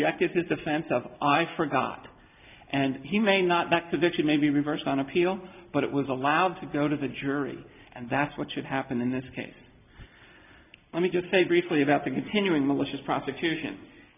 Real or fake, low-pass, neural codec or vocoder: real; 3.6 kHz; none